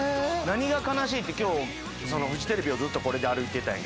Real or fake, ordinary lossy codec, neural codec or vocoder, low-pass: real; none; none; none